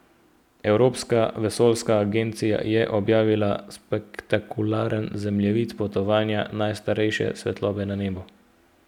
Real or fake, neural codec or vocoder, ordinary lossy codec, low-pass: real; none; none; 19.8 kHz